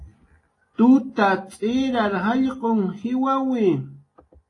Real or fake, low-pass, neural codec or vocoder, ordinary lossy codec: real; 10.8 kHz; none; AAC, 32 kbps